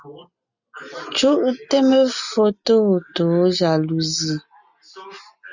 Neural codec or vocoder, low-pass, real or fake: none; 7.2 kHz; real